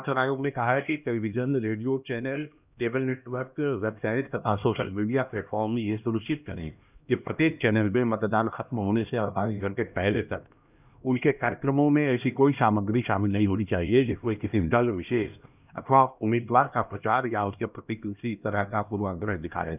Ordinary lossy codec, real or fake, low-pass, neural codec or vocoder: none; fake; 3.6 kHz; codec, 16 kHz, 1 kbps, X-Codec, HuBERT features, trained on LibriSpeech